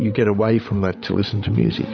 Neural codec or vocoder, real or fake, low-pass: codec, 16 kHz, 8 kbps, FreqCodec, larger model; fake; 7.2 kHz